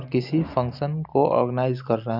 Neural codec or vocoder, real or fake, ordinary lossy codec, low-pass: none; real; none; 5.4 kHz